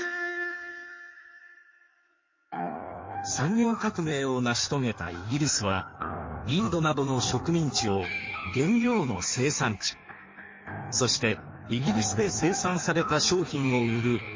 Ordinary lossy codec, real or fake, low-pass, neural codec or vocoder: MP3, 32 kbps; fake; 7.2 kHz; codec, 16 kHz in and 24 kHz out, 1.1 kbps, FireRedTTS-2 codec